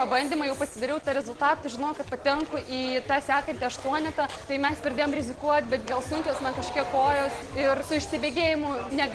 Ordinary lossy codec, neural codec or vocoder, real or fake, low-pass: Opus, 16 kbps; none; real; 10.8 kHz